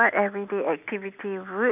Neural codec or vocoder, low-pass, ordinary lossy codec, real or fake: none; 3.6 kHz; none; real